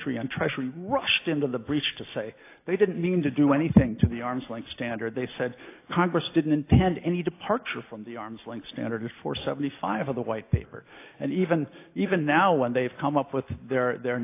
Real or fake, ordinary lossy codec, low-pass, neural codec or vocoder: real; AAC, 24 kbps; 3.6 kHz; none